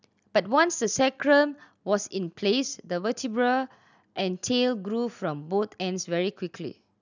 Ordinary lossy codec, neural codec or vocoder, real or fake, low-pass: none; none; real; 7.2 kHz